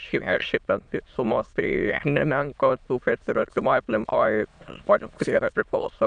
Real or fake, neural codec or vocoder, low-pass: fake; autoencoder, 22.05 kHz, a latent of 192 numbers a frame, VITS, trained on many speakers; 9.9 kHz